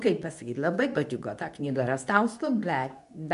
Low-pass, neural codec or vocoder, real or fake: 10.8 kHz; codec, 24 kHz, 0.9 kbps, WavTokenizer, medium speech release version 2; fake